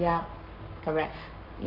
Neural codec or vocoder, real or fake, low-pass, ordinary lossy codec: codec, 44.1 kHz, 7.8 kbps, Pupu-Codec; fake; 5.4 kHz; none